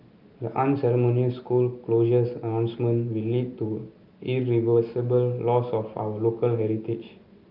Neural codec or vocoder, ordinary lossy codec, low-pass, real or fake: none; Opus, 24 kbps; 5.4 kHz; real